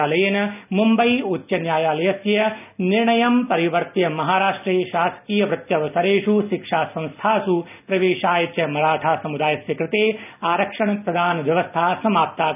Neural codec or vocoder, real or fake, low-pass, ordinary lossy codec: none; real; 3.6 kHz; none